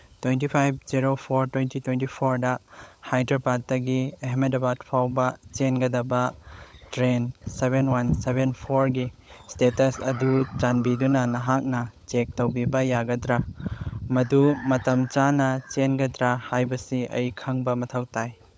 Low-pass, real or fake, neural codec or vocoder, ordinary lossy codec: none; fake; codec, 16 kHz, 16 kbps, FunCodec, trained on LibriTTS, 50 frames a second; none